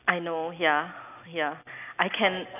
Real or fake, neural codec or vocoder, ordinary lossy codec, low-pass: real; none; none; 3.6 kHz